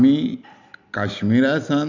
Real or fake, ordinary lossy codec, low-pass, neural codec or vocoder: fake; none; 7.2 kHz; vocoder, 44.1 kHz, 128 mel bands every 256 samples, BigVGAN v2